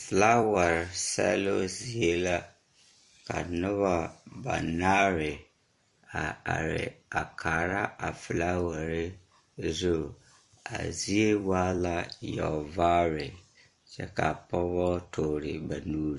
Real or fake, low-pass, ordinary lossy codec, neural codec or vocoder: fake; 14.4 kHz; MP3, 48 kbps; vocoder, 44.1 kHz, 128 mel bands every 256 samples, BigVGAN v2